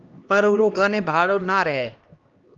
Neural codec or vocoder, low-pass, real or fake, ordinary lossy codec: codec, 16 kHz, 1 kbps, X-Codec, HuBERT features, trained on LibriSpeech; 7.2 kHz; fake; Opus, 32 kbps